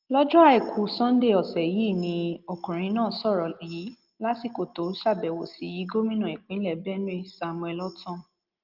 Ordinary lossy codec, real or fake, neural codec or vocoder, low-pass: Opus, 32 kbps; real; none; 5.4 kHz